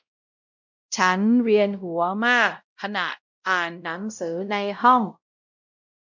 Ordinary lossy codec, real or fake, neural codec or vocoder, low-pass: none; fake; codec, 16 kHz, 0.5 kbps, X-Codec, WavLM features, trained on Multilingual LibriSpeech; 7.2 kHz